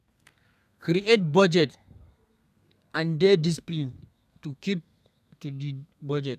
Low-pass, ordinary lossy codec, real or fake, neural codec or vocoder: 14.4 kHz; none; fake; codec, 32 kHz, 1.9 kbps, SNAC